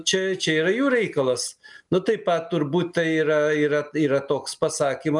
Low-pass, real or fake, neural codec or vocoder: 10.8 kHz; real; none